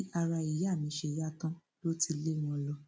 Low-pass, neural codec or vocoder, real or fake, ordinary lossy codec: none; none; real; none